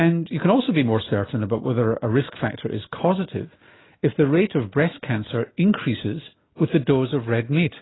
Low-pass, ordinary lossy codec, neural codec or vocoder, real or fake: 7.2 kHz; AAC, 16 kbps; none; real